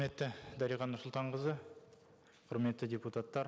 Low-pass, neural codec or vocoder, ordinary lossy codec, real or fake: none; none; none; real